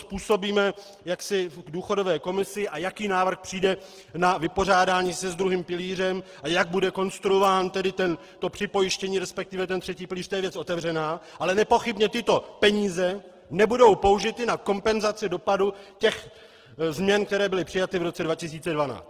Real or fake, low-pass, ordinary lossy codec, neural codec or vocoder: real; 14.4 kHz; Opus, 16 kbps; none